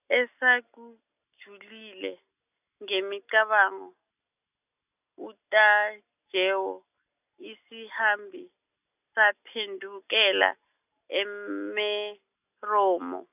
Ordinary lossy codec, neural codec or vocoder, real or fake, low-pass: none; none; real; 3.6 kHz